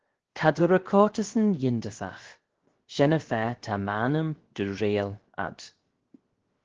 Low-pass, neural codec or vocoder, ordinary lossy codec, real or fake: 7.2 kHz; codec, 16 kHz, 0.7 kbps, FocalCodec; Opus, 16 kbps; fake